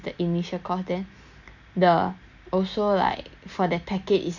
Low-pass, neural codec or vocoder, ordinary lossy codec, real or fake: 7.2 kHz; none; none; real